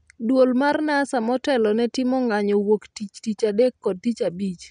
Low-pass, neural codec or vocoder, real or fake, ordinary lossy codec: 9.9 kHz; none; real; none